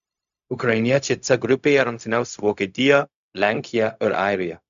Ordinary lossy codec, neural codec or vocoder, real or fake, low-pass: AAC, 96 kbps; codec, 16 kHz, 0.4 kbps, LongCat-Audio-Codec; fake; 7.2 kHz